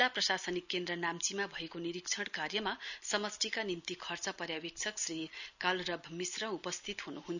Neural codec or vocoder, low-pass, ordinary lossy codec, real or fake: none; 7.2 kHz; none; real